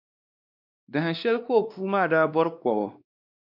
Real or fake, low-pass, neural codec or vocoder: fake; 5.4 kHz; codec, 16 kHz, 2 kbps, X-Codec, WavLM features, trained on Multilingual LibriSpeech